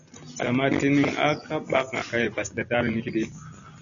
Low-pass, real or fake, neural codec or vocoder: 7.2 kHz; real; none